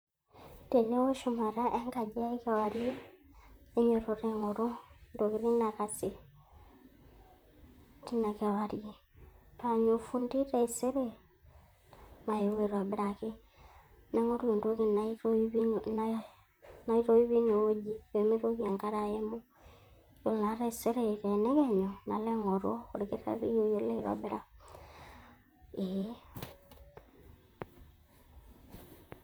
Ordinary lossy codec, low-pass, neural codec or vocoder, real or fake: none; none; vocoder, 44.1 kHz, 128 mel bands, Pupu-Vocoder; fake